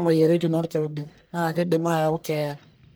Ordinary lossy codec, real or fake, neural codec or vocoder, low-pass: none; fake; codec, 44.1 kHz, 1.7 kbps, Pupu-Codec; none